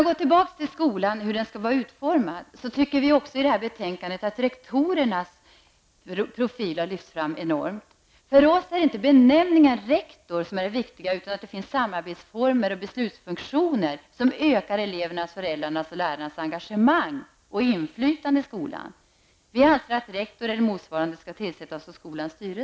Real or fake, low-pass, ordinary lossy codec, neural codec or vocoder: real; none; none; none